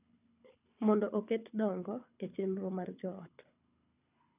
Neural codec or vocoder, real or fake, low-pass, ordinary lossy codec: codec, 24 kHz, 6 kbps, HILCodec; fake; 3.6 kHz; none